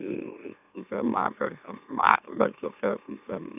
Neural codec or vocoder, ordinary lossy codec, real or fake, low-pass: autoencoder, 44.1 kHz, a latent of 192 numbers a frame, MeloTTS; none; fake; 3.6 kHz